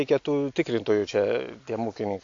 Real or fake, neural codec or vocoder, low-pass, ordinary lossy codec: real; none; 7.2 kHz; AAC, 48 kbps